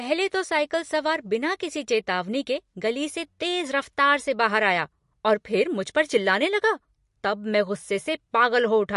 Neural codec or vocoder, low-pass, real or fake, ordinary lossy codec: none; 14.4 kHz; real; MP3, 48 kbps